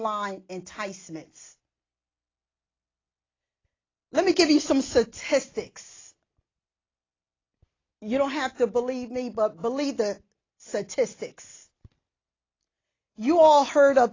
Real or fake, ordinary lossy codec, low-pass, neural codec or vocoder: real; AAC, 32 kbps; 7.2 kHz; none